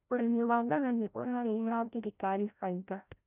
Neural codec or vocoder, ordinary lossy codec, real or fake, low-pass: codec, 16 kHz, 0.5 kbps, FreqCodec, larger model; none; fake; 3.6 kHz